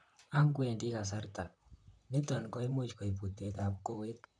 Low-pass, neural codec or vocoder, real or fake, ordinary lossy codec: 9.9 kHz; vocoder, 44.1 kHz, 128 mel bands, Pupu-Vocoder; fake; none